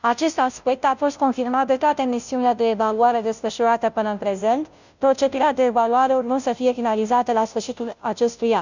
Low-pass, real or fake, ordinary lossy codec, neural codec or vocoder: 7.2 kHz; fake; none; codec, 16 kHz, 0.5 kbps, FunCodec, trained on Chinese and English, 25 frames a second